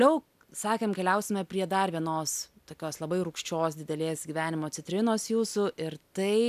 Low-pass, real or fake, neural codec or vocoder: 14.4 kHz; real; none